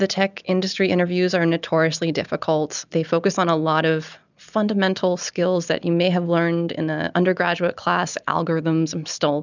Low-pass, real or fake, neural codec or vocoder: 7.2 kHz; real; none